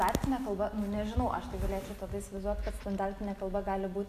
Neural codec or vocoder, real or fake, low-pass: none; real; 14.4 kHz